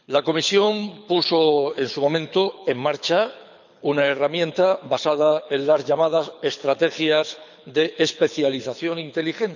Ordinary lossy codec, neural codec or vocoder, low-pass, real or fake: none; codec, 24 kHz, 6 kbps, HILCodec; 7.2 kHz; fake